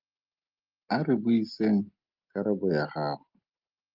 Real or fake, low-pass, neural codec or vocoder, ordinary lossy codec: real; 5.4 kHz; none; Opus, 32 kbps